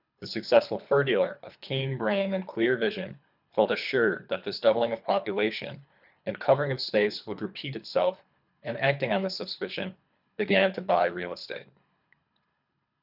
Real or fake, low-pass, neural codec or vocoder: fake; 5.4 kHz; codec, 24 kHz, 3 kbps, HILCodec